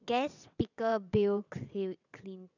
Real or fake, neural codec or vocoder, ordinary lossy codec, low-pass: fake; vocoder, 22.05 kHz, 80 mel bands, WaveNeXt; none; 7.2 kHz